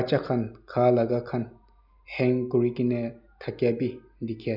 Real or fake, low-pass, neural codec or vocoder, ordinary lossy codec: real; 5.4 kHz; none; none